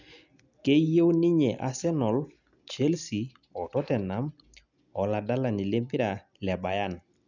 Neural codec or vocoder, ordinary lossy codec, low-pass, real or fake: none; none; 7.2 kHz; real